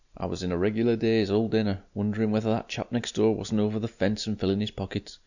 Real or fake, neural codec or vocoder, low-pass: real; none; 7.2 kHz